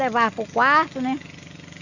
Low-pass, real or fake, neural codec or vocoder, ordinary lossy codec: 7.2 kHz; real; none; none